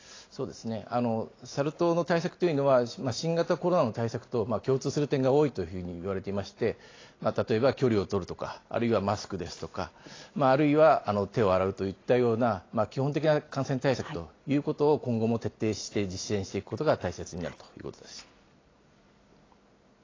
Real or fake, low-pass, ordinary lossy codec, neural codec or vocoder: real; 7.2 kHz; AAC, 32 kbps; none